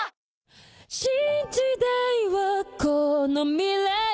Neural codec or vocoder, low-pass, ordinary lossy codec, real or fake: none; none; none; real